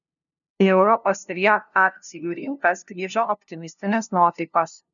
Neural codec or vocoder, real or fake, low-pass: codec, 16 kHz, 0.5 kbps, FunCodec, trained on LibriTTS, 25 frames a second; fake; 7.2 kHz